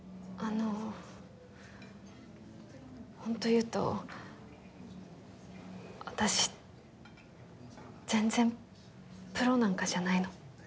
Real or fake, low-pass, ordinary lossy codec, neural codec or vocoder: real; none; none; none